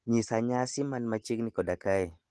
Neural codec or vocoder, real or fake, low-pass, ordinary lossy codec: none; real; 10.8 kHz; Opus, 16 kbps